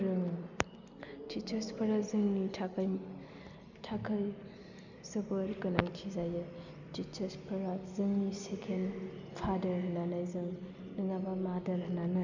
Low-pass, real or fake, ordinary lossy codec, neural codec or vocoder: 7.2 kHz; real; none; none